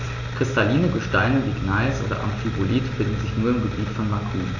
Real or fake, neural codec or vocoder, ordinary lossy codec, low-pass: real; none; none; 7.2 kHz